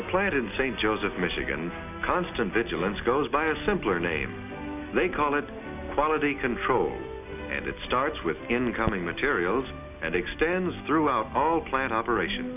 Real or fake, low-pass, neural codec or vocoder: real; 3.6 kHz; none